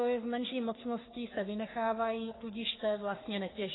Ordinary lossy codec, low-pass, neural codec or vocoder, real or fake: AAC, 16 kbps; 7.2 kHz; codec, 44.1 kHz, 3.4 kbps, Pupu-Codec; fake